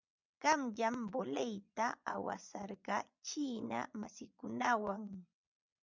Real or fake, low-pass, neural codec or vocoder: fake; 7.2 kHz; vocoder, 22.05 kHz, 80 mel bands, Vocos